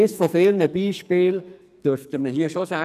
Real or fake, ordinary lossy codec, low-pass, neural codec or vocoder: fake; none; 14.4 kHz; codec, 44.1 kHz, 2.6 kbps, SNAC